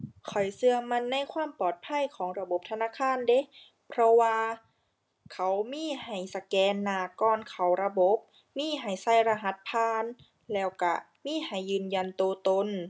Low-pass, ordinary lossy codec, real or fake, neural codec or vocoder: none; none; real; none